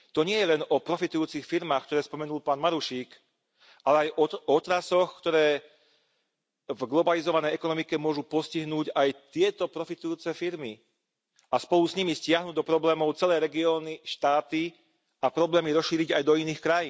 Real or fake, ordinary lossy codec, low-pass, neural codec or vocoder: real; none; none; none